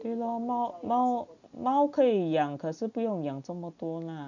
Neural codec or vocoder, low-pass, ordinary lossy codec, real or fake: none; 7.2 kHz; none; real